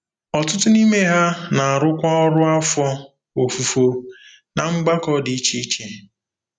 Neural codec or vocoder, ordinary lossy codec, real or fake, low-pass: none; none; real; 9.9 kHz